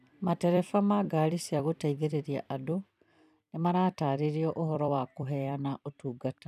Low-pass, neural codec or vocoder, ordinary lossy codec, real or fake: 14.4 kHz; vocoder, 44.1 kHz, 128 mel bands every 256 samples, BigVGAN v2; none; fake